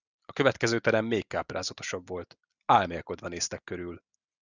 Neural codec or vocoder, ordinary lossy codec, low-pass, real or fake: none; Opus, 64 kbps; 7.2 kHz; real